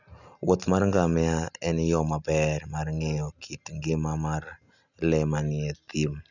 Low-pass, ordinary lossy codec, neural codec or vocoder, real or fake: 7.2 kHz; none; none; real